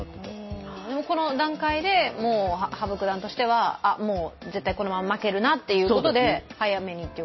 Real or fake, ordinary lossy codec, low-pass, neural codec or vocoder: real; MP3, 24 kbps; 7.2 kHz; none